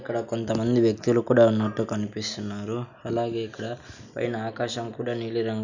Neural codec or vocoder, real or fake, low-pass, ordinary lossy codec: none; real; 7.2 kHz; none